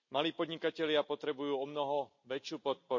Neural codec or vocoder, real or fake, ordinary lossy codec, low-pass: none; real; MP3, 48 kbps; 7.2 kHz